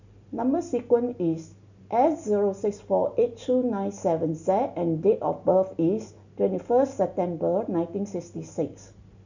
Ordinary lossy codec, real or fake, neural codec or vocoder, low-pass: none; real; none; 7.2 kHz